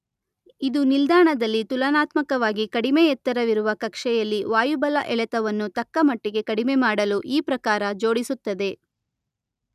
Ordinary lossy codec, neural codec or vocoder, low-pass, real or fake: none; none; 14.4 kHz; real